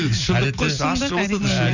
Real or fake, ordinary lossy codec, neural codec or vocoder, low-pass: real; none; none; 7.2 kHz